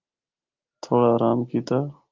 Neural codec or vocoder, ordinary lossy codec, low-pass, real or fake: none; Opus, 32 kbps; 7.2 kHz; real